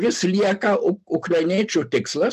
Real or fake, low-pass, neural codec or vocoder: real; 14.4 kHz; none